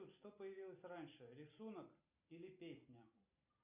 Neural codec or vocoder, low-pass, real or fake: none; 3.6 kHz; real